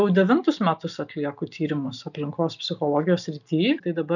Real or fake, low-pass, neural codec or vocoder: real; 7.2 kHz; none